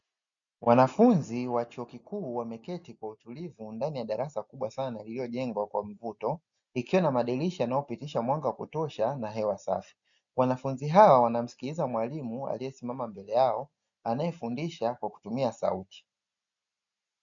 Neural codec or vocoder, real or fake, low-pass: none; real; 7.2 kHz